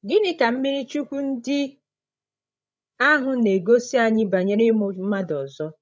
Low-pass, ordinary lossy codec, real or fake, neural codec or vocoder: none; none; fake; codec, 16 kHz, 16 kbps, FreqCodec, larger model